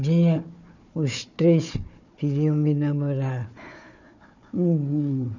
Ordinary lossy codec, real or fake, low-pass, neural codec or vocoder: none; fake; 7.2 kHz; codec, 16 kHz, 4 kbps, FunCodec, trained on Chinese and English, 50 frames a second